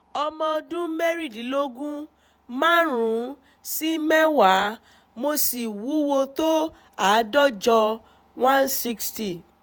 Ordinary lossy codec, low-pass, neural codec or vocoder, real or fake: none; none; vocoder, 48 kHz, 128 mel bands, Vocos; fake